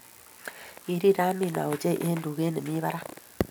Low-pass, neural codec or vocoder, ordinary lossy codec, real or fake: none; none; none; real